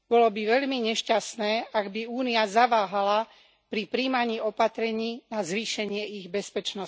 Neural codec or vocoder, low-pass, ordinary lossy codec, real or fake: none; none; none; real